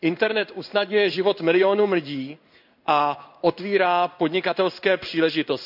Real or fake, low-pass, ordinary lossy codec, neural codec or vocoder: fake; 5.4 kHz; none; codec, 16 kHz in and 24 kHz out, 1 kbps, XY-Tokenizer